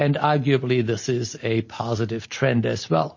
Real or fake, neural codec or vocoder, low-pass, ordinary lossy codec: real; none; 7.2 kHz; MP3, 32 kbps